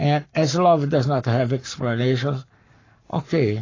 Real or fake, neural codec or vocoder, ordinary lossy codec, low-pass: fake; codec, 44.1 kHz, 7.8 kbps, Pupu-Codec; AAC, 32 kbps; 7.2 kHz